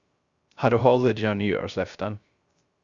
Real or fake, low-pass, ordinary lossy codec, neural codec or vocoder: fake; 7.2 kHz; Opus, 64 kbps; codec, 16 kHz, 0.3 kbps, FocalCodec